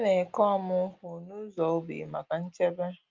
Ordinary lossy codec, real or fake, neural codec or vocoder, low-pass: Opus, 24 kbps; real; none; 7.2 kHz